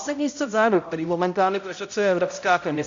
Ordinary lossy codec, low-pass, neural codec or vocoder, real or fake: AAC, 48 kbps; 7.2 kHz; codec, 16 kHz, 0.5 kbps, X-Codec, HuBERT features, trained on balanced general audio; fake